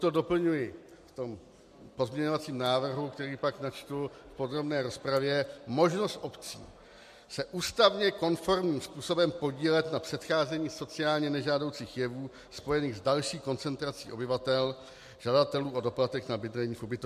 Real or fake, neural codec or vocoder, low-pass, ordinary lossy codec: real; none; 14.4 kHz; MP3, 64 kbps